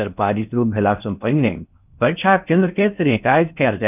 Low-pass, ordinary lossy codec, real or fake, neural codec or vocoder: 3.6 kHz; none; fake; codec, 16 kHz in and 24 kHz out, 0.6 kbps, FocalCodec, streaming, 4096 codes